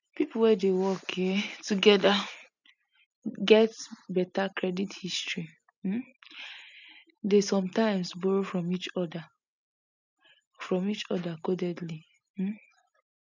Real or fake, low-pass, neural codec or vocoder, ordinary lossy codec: real; 7.2 kHz; none; none